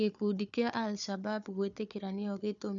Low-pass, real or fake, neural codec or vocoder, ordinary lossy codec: 7.2 kHz; fake; codec, 16 kHz, 4 kbps, FunCodec, trained on Chinese and English, 50 frames a second; none